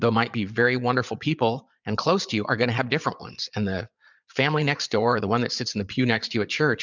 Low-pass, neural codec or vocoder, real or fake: 7.2 kHz; vocoder, 22.05 kHz, 80 mel bands, Vocos; fake